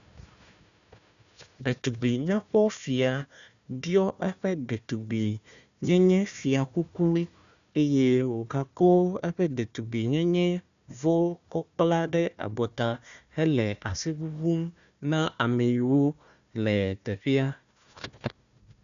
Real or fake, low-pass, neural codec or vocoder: fake; 7.2 kHz; codec, 16 kHz, 1 kbps, FunCodec, trained on Chinese and English, 50 frames a second